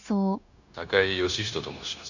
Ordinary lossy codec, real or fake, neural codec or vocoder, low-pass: none; fake; codec, 16 kHz, 0.9 kbps, LongCat-Audio-Codec; 7.2 kHz